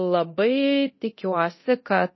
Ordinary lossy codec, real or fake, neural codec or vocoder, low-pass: MP3, 24 kbps; fake; codec, 24 kHz, 0.9 kbps, DualCodec; 7.2 kHz